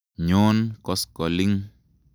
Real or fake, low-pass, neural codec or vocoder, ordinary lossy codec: real; none; none; none